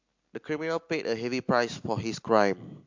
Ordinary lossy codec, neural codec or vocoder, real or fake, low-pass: MP3, 48 kbps; none; real; 7.2 kHz